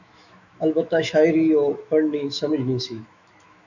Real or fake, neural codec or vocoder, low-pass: fake; autoencoder, 48 kHz, 128 numbers a frame, DAC-VAE, trained on Japanese speech; 7.2 kHz